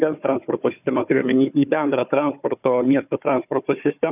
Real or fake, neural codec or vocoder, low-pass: fake; codec, 16 kHz, 4 kbps, FunCodec, trained on Chinese and English, 50 frames a second; 3.6 kHz